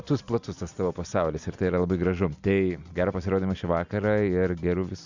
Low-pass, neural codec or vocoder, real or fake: 7.2 kHz; none; real